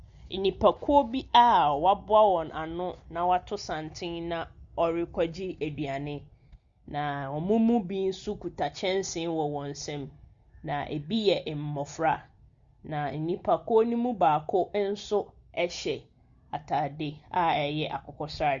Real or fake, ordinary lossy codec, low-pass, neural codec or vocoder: real; Opus, 64 kbps; 7.2 kHz; none